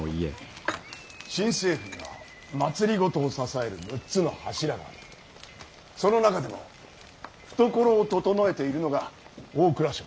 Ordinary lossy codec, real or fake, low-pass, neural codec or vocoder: none; real; none; none